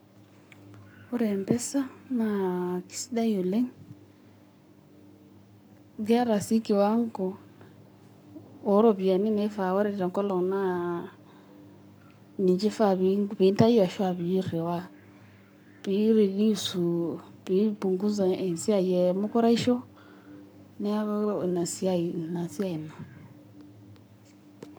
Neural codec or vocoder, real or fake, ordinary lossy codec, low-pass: codec, 44.1 kHz, 7.8 kbps, Pupu-Codec; fake; none; none